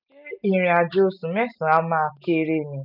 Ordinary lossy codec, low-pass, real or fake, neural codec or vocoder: none; 5.4 kHz; real; none